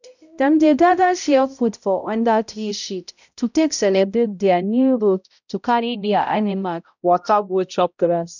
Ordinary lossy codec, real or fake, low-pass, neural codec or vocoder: none; fake; 7.2 kHz; codec, 16 kHz, 0.5 kbps, X-Codec, HuBERT features, trained on balanced general audio